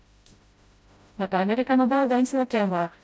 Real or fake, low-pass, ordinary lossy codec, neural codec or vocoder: fake; none; none; codec, 16 kHz, 0.5 kbps, FreqCodec, smaller model